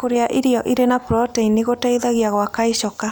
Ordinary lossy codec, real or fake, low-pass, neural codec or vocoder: none; real; none; none